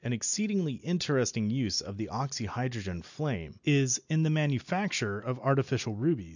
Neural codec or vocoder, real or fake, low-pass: none; real; 7.2 kHz